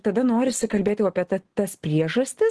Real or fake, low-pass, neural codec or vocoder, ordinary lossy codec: real; 10.8 kHz; none; Opus, 16 kbps